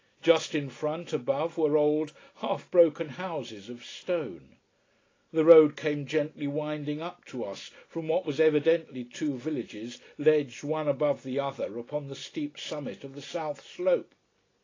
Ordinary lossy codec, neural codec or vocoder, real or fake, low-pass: AAC, 32 kbps; none; real; 7.2 kHz